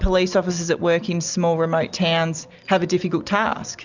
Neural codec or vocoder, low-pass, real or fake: none; 7.2 kHz; real